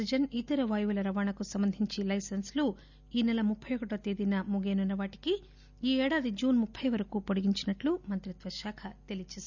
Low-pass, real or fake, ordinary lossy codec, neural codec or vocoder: 7.2 kHz; real; none; none